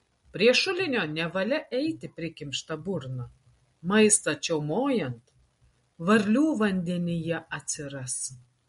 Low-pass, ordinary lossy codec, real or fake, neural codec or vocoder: 19.8 kHz; MP3, 48 kbps; real; none